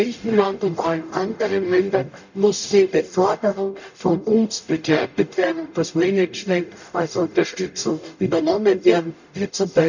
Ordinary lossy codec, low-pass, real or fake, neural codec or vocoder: none; 7.2 kHz; fake; codec, 44.1 kHz, 0.9 kbps, DAC